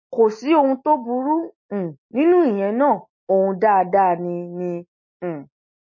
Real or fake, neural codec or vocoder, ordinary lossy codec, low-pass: real; none; MP3, 32 kbps; 7.2 kHz